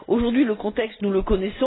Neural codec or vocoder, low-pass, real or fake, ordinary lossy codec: none; 7.2 kHz; real; AAC, 16 kbps